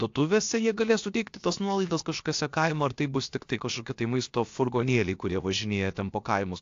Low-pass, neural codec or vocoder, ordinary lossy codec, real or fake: 7.2 kHz; codec, 16 kHz, about 1 kbps, DyCAST, with the encoder's durations; AAC, 48 kbps; fake